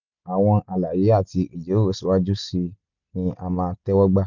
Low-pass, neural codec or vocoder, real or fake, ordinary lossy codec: 7.2 kHz; none; real; none